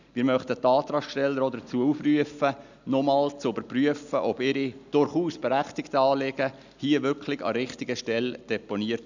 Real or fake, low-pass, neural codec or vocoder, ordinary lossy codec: real; 7.2 kHz; none; none